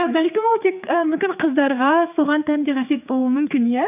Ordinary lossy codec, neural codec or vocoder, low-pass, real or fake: none; codec, 16 kHz, 4 kbps, X-Codec, HuBERT features, trained on general audio; 3.6 kHz; fake